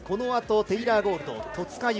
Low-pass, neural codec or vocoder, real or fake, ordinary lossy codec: none; none; real; none